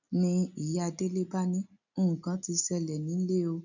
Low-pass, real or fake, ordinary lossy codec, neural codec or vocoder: 7.2 kHz; real; none; none